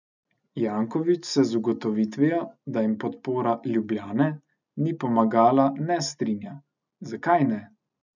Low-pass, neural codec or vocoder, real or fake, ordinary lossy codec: 7.2 kHz; none; real; none